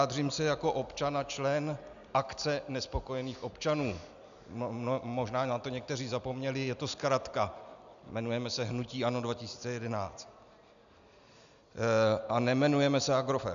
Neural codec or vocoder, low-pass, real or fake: none; 7.2 kHz; real